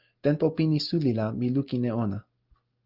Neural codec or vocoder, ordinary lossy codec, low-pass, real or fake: none; Opus, 32 kbps; 5.4 kHz; real